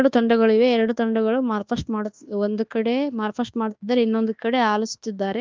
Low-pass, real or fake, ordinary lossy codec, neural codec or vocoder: 7.2 kHz; fake; Opus, 32 kbps; codec, 24 kHz, 1.2 kbps, DualCodec